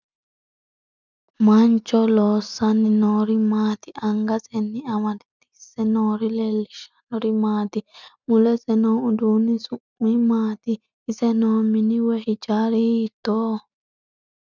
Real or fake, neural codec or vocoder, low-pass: real; none; 7.2 kHz